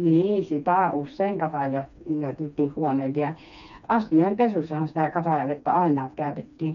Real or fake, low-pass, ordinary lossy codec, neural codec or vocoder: fake; 7.2 kHz; none; codec, 16 kHz, 2 kbps, FreqCodec, smaller model